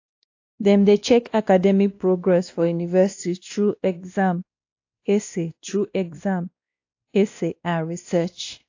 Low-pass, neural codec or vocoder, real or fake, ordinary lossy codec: 7.2 kHz; codec, 16 kHz, 1 kbps, X-Codec, WavLM features, trained on Multilingual LibriSpeech; fake; AAC, 48 kbps